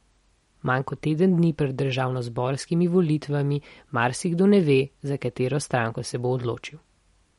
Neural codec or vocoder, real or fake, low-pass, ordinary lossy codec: none; real; 19.8 kHz; MP3, 48 kbps